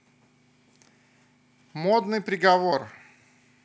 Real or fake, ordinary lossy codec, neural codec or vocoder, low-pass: real; none; none; none